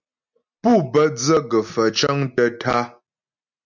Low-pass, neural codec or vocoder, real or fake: 7.2 kHz; none; real